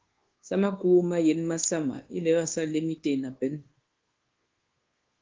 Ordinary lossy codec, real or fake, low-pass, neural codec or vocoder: Opus, 16 kbps; fake; 7.2 kHz; codec, 24 kHz, 1.2 kbps, DualCodec